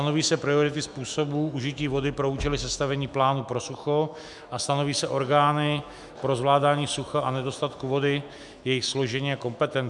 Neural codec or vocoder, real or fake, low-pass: autoencoder, 48 kHz, 128 numbers a frame, DAC-VAE, trained on Japanese speech; fake; 10.8 kHz